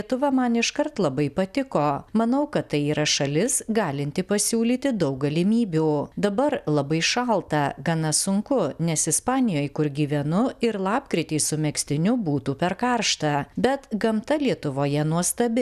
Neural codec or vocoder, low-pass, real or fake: none; 14.4 kHz; real